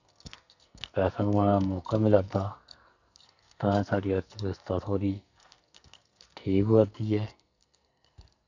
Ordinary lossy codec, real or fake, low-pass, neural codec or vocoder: none; fake; 7.2 kHz; codec, 44.1 kHz, 2.6 kbps, SNAC